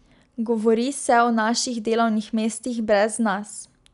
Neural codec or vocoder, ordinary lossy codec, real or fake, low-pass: none; none; real; 10.8 kHz